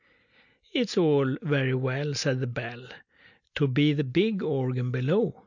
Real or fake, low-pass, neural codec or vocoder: real; 7.2 kHz; none